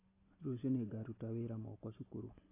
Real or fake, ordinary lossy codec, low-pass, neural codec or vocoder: real; AAC, 24 kbps; 3.6 kHz; none